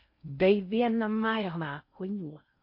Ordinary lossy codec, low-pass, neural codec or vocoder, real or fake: MP3, 32 kbps; 5.4 kHz; codec, 16 kHz in and 24 kHz out, 0.6 kbps, FocalCodec, streaming, 4096 codes; fake